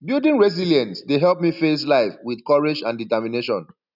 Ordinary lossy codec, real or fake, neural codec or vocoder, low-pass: none; real; none; 5.4 kHz